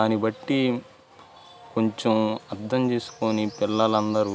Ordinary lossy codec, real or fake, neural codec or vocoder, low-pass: none; real; none; none